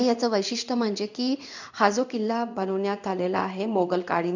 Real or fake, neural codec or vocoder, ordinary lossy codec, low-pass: fake; codec, 16 kHz in and 24 kHz out, 1 kbps, XY-Tokenizer; none; 7.2 kHz